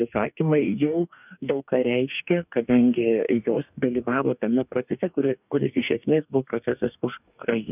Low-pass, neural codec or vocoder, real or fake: 3.6 kHz; codec, 44.1 kHz, 2.6 kbps, DAC; fake